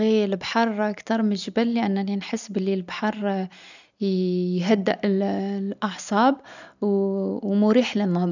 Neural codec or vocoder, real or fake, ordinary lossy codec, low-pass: none; real; none; 7.2 kHz